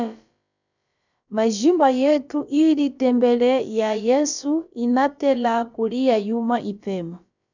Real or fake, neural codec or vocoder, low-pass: fake; codec, 16 kHz, about 1 kbps, DyCAST, with the encoder's durations; 7.2 kHz